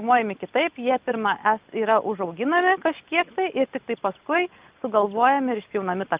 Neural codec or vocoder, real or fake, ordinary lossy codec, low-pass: none; real; Opus, 24 kbps; 3.6 kHz